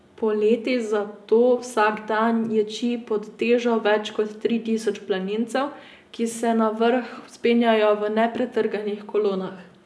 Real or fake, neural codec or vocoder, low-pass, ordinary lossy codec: real; none; none; none